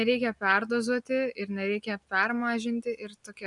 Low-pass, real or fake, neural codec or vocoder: 10.8 kHz; real; none